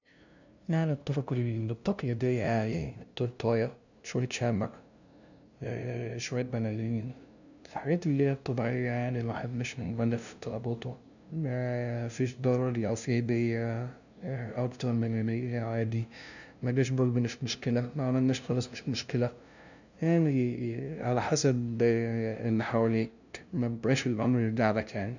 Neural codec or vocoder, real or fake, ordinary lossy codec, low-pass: codec, 16 kHz, 0.5 kbps, FunCodec, trained on LibriTTS, 25 frames a second; fake; none; 7.2 kHz